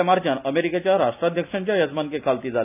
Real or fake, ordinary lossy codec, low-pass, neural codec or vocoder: real; AAC, 32 kbps; 3.6 kHz; none